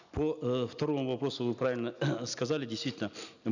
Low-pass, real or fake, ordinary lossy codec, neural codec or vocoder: 7.2 kHz; real; none; none